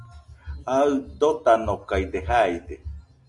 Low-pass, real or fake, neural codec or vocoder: 10.8 kHz; real; none